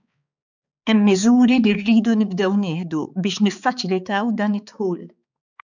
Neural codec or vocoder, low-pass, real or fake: codec, 16 kHz, 4 kbps, X-Codec, HuBERT features, trained on balanced general audio; 7.2 kHz; fake